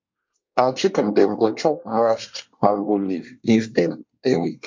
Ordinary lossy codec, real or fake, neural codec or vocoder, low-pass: MP3, 48 kbps; fake; codec, 24 kHz, 1 kbps, SNAC; 7.2 kHz